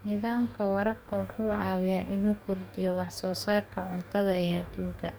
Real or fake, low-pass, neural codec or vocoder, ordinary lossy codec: fake; none; codec, 44.1 kHz, 2.6 kbps, DAC; none